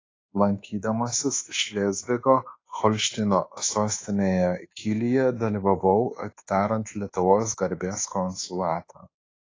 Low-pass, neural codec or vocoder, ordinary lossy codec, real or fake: 7.2 kHz; codec, 24 kHz, 1.2 kbps, DualCodec; AAC, 32 kbps; fake